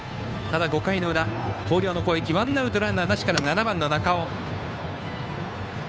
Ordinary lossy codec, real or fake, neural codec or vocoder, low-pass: none; fake; codec, 16 kHz, 2 kbps, FunCodec, trained on Chinese and English, 25 frames a second; none